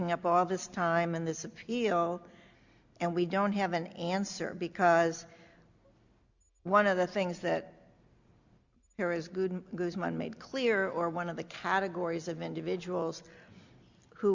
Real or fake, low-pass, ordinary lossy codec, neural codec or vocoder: real; 7.2 kHz; Opus, 64 kbps; none